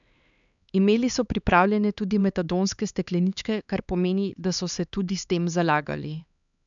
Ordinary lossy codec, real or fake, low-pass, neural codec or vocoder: none; fake; 7.2 kHz; codec, 16 kHz, 4 kbps, X-Codec, HuBERT features, trained on LibriSpeech